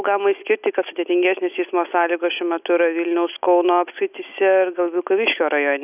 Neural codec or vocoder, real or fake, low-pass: none; real; 3.6 kHz